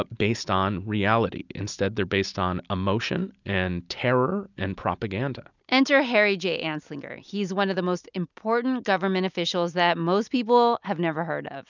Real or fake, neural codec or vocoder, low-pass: real; none; 7.2 kHz